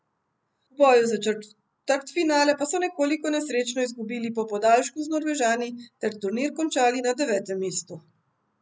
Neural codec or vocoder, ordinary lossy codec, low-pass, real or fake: none; none; none; real